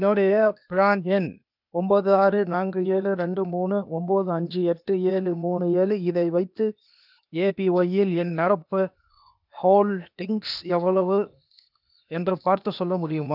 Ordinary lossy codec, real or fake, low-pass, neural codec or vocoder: none; fake; 5.4 kHz; codec, 16 kHz, 0.8 kbps, ZipCodec